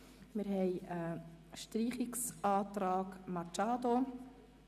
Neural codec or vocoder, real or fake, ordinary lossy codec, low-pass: none; real; none; 14.4 kHz